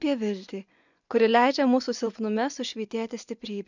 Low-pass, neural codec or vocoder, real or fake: 7.2 kHz; vocoder, 24 kHz, 100 mel bands, Vocos; fake